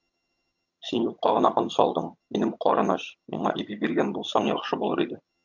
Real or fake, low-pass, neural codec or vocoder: fake; 7.2 kHz; vocoder, 22.05 kHz, 80 mel bands, HiFi-GAN